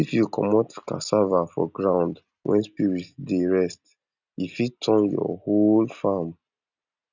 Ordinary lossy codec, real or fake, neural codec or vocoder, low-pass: none; real; none; 7.2 kHz